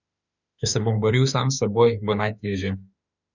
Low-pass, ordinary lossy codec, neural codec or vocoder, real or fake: 7.2 kHz; none; autoencoder, 48 kHz, 32 numbers a frame, DAC-VAE, trained on Japanese speech; fake